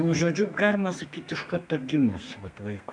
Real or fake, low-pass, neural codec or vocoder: fake; 9.9 kHz; codec, 32 kHz, 1.9 kbps, SNAC